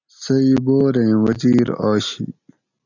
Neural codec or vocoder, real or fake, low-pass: none; real; 7.2 kHz